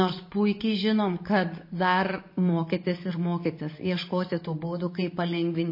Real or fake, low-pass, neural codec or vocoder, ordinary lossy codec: real; 5.4 kHz; none; MP3, 24 kbps